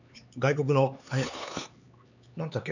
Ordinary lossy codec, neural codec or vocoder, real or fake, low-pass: none; codec, 16 kHz, 4 kbps, X-Codec, HuBERT features, trained on LibriSpeech; fake; 7.2 kHz